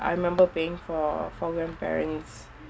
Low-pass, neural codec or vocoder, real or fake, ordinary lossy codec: none; none; real; none